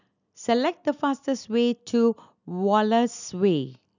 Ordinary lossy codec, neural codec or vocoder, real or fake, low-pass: none; none; real; 7.2 kHz